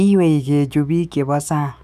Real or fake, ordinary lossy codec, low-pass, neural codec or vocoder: fake; none; 14.4 kHz; autoencoder, 48 kHz, 128 numbers a frame, DAC-VAE, trained on Japanese speech